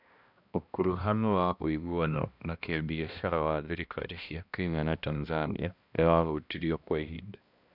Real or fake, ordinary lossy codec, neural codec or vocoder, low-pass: fake; none; codec, 16 kHz, 1 kbps, X-Codec, HuBERT features, trained on balanced general audio; 5.4 kHz